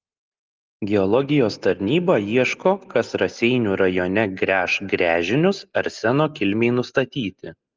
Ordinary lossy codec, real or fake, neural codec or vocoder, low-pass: Opus, 32 kbps; real; none; 7.2 kHz